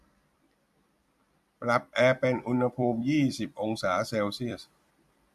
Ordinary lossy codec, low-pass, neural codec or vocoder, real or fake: AAC, 96 kbps; 14.4 kHz; vocoder, 44.1 kHz, 128 mel bands every 256 samples, BigVGAN v2; fake